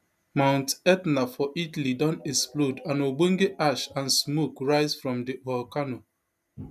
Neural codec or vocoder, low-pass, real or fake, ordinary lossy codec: none; 14.4 kHz; real; none